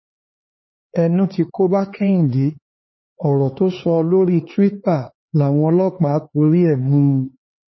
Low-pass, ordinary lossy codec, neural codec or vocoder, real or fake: 7.2 kHz; MP3, 24 kbps; codec, 16 kHz, 4 kbps, X-Codec, HuBERT features, trained on LibriSpeech; fake